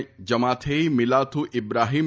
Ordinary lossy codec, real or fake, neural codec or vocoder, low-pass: none; real; none; none